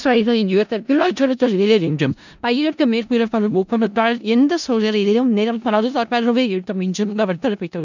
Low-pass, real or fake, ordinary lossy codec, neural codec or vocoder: 7.2 kHz; fake; none; codec, 16 kHz in and 24 kHz out, 0.4 kbps, LongCat-Audio-Codec, four codebook decoder